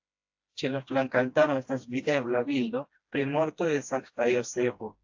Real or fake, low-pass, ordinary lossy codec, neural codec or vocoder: fake; 7.2 kHz; AAC, 48 kbps; codec, 16 kHz, 1 kbps, FreqCodec, smaller model